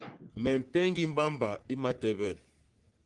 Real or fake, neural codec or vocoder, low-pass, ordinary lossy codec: fake; codec, 44.1 kHz, 3.4 kbps, Pupu-Codec; 10.8 kHz; Opus, 32 kbps